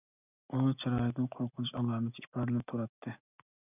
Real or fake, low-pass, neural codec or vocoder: real; 3.6 kHz; none